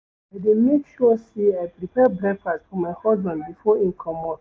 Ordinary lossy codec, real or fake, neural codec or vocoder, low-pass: none; real; none; none